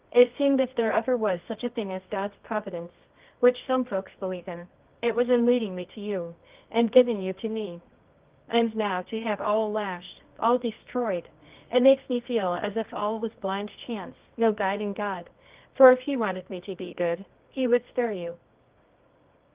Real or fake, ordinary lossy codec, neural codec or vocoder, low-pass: fake; Opus, 32 kbps; codec, 24 kHz, 0.9 kbps, WavTokenizer, medium music audio release; 3.6 kHz